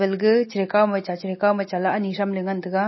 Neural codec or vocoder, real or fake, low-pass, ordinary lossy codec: none; real; 7.2 kHz; MP3, 24 kbps